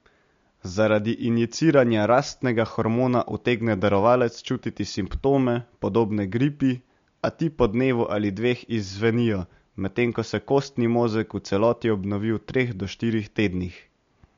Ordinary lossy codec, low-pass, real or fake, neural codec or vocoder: MP3, 48 kbps; 7.2 kHz; real; none